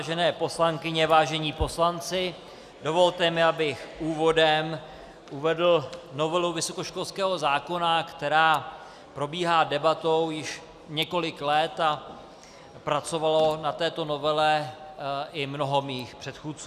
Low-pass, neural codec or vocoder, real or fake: 14.4 kHz; none; real